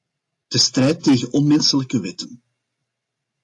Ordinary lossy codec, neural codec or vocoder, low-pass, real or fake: AAC, 48 kbps; none; 10.8 kHz; real